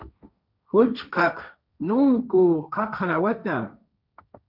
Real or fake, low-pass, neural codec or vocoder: fake; 5.4 kHz; codec, 16 kHz, 1.1 kbps, Voila-Tokenizer